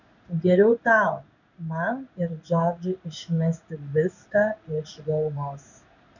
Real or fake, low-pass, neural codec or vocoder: fake; 7.2 kHz; codec, 16 kHz in and 24 kHz out, 1 kbps, XY-Tokenizer